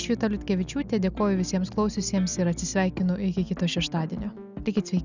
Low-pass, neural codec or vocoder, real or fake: 7.2 kHz; none; real